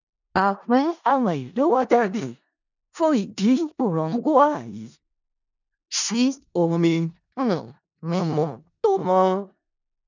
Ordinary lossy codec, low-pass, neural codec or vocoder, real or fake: none; 7.2 kHz; codec, 16 kHz in and 24 kHz out, 0.4 kbps, LongCat-Audio-Codec, four codebook decoder; fake